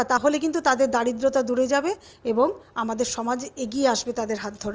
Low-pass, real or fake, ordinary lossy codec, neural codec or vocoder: 7.2 kHz; real; Opus, 24 kbps; none